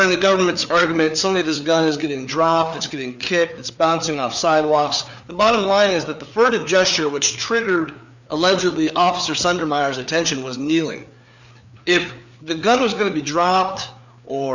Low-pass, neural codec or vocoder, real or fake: 7.2 kHz; codec, 16 kHz, 4 kbps, FreqCodec, larger model; fake